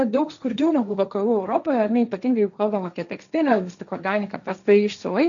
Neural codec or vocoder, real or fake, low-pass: codec, 16 kHz, 1.1 kbps, Voila-Tokenizer; fake; 7.2 kHz